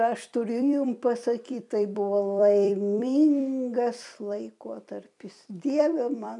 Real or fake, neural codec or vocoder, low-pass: fake; vocoder, 44.1 kHz, 128 mel bands every 256 samples, BigVGAN v2; 10.8 kHz